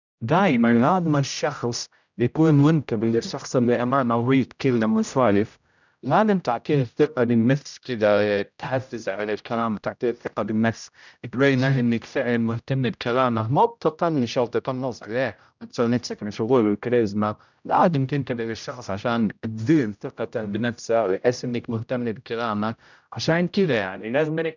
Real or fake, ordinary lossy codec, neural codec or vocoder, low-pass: fake; none; codec, 16 kHz, 0.5 kbps, X-Codec, HuBERT features, trained on general audio; 7.2 kHz